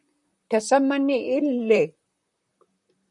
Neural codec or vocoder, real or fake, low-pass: vocoder, 44.1 kHz, 128 mel bands, Pupu-Vocoder; fake; 10.8 kHz